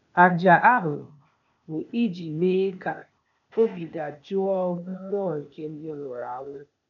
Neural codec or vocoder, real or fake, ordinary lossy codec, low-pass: codec, 16 kHz, 0.8 kbps, ZipCodec; fake; none; 7.2 kHz